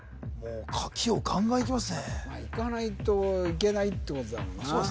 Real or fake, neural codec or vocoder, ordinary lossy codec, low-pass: real; none; none; none